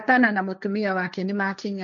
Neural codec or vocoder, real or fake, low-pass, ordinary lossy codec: codec, 16 kHz, 1.1 kbps, Voila-Tokenizer; fake; 7.2 kHz; none